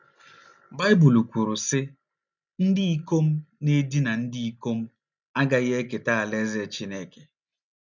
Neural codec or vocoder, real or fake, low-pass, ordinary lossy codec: none; real; 7.2 kHz; none